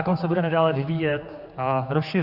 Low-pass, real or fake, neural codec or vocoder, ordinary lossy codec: 5.4 kHz; fake; codec, 16 kHz, 4 kbps, X-Codec, HuBERT features, trained on general audio; Opus, 64 kbps